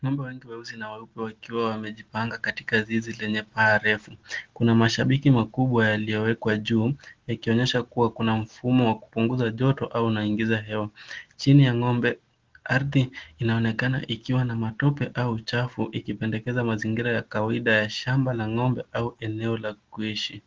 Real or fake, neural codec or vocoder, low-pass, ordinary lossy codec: real; none; 7.2 kHz; Opus, 16 kbps